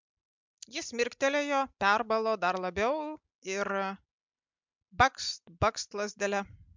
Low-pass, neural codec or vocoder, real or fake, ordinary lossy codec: 7.2 kHz; none; real; MP3, 64 kbps